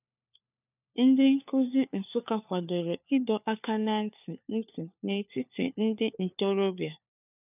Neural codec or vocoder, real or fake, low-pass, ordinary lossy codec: codec, 16 kHz, 4 kbps, FunCodec, trained on LibriTTS, 50 frames a second; fake; 3.6 kHz; none